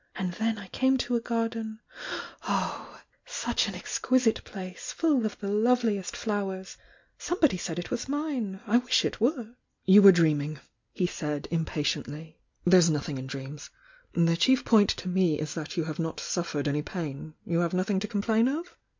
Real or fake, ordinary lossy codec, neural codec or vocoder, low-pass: real; MP3, 64 kbps; none; 7.2 kHz